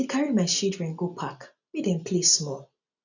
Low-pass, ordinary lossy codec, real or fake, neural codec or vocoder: 7.2 kHz; none; real; none